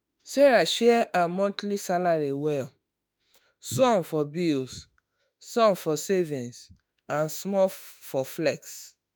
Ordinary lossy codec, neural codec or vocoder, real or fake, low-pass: none; autoencoder, 48 kHz, 32 numbers a frame, DAC-VAE, trained on Japanese speech; fake; none